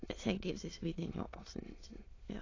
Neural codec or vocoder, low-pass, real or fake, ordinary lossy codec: autoencoder, 22.05 kHz, a latent of 192 numbers a frame, VITS, trained on many speakers; 7.2 kHz; fake; AAC, 32 kbps